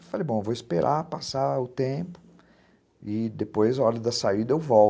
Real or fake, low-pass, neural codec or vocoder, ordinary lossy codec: real; none; none; none